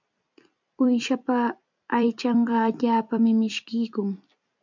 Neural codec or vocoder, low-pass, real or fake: vocoder, 44.1 kHz, 128 mel bands every 256 samples, BigVGAN v2; 7.2 kHz; fake